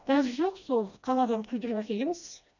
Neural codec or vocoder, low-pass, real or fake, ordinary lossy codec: codec, 16 kHz, 1 kbps, FreqCodec, smaller model; 7.2 kHz; fake; none